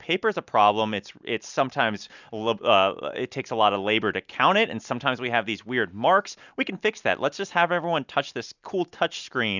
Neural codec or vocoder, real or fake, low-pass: none; real; 7.2 kHz